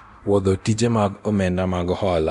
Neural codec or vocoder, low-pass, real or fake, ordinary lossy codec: codec, 24 kHz, 0.9 kbps, DualCodec; 10.8 kHz; fake; none